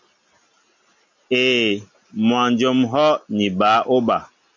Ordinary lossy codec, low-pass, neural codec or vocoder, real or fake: MP3, 64 kbps; 7.2 kHz; none; real